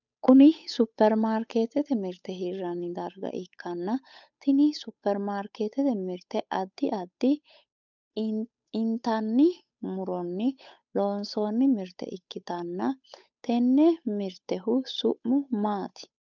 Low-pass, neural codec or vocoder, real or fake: 7.2 kHz; codec, 16 kHz, 8 kbps, FunCodec, trained on Chinese and English, 25 frames a second; fake